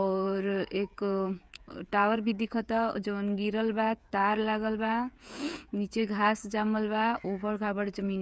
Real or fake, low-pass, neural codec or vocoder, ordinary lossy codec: fake; none; codec, 16 kHz, 8 kbps, FreqCodec, smaller model; none